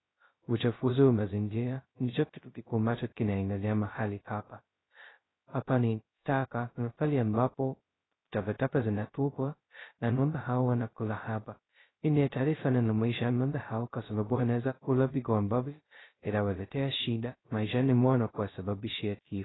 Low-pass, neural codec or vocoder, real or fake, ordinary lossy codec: 7.2 kHz; codec, 16 kHz, 0.2 kbps, FocalCodec; fake; AAC, 16 kbps